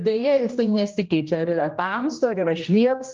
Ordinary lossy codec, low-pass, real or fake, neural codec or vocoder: Opus, 24 kbps; 7.2 kHz; fake; codec, 16 kHz, 1 kbps, X-Codec, HuBERT features, trained on general audio